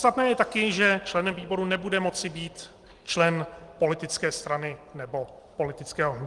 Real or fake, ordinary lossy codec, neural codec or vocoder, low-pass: real; Opus, 24 kbps; none; 10.8 kHz